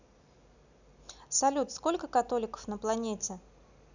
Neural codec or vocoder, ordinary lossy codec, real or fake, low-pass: none; none; real; 7.2 kHz